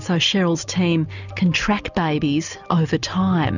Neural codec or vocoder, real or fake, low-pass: none; real; 7.2 kHz